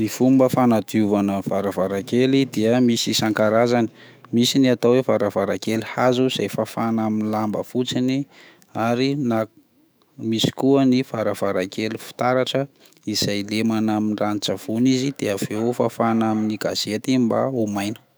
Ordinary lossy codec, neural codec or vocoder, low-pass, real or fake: none; autoencoder, 48 kHz, 128 numbers a frame, DAC-VAE, trained on Japanese speech; none; fake